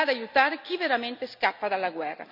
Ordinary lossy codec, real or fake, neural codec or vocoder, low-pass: none; real; none; 5.4 kHz